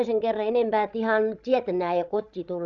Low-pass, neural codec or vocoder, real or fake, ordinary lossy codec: 7.2 kHz; codec, 16 kHz, 16 kbps, FreqCodec, larger model; fake; Opus, 64 kbps